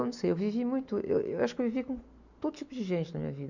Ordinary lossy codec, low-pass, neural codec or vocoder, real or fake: none; 7.2 kHz; none; real